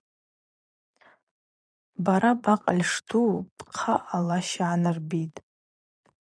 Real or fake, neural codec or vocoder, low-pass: fake; vocoder, 22.05 kHz, 80 mel bands, Vocos; 9.9 kHz